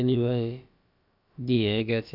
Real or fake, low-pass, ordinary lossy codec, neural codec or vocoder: fake; 5.4 kHz; none; codec, 16 kHz, about 1 kbps, DyCAST, with the encoder's durations